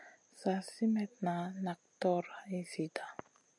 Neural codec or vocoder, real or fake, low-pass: none; real; 10.8 kHz